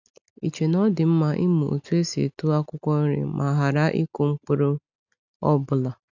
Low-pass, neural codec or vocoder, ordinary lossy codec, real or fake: 7.2 kHz; none; none; real